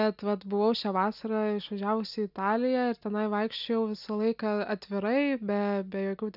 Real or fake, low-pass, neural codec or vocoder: real; 5.4 kHz; none